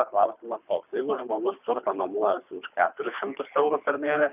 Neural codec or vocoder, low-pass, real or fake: codec, 24 kHz, 3 kbps, HILCodec; 3.6 kHz; fake